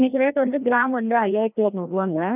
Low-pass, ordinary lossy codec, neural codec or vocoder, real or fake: 3.6 kHz; none; codec, 16 kHz, 1 kbps, FreqCodec, larger model; fake